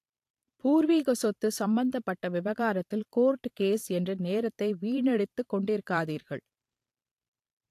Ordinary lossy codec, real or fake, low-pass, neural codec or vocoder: AAC, 64 kbps; fake; 14.4 kHz; vocoder, 44.1 kHz, 128 mel bands every 512 samples, BigVGAN v2